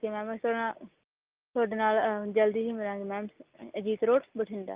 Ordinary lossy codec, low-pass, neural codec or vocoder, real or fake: Opus, 32 kbps; 3.6 kHz; none; real